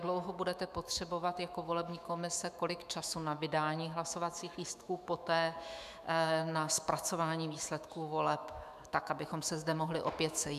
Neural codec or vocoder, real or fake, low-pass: autoencoder, 48 kHz, 128 numbers a frame, DAC-VAE, trained on Japanese speech; fake; 14.4 kHz